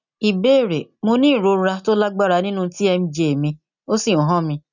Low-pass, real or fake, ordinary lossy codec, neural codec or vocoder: 7.2 kHz; real; none; none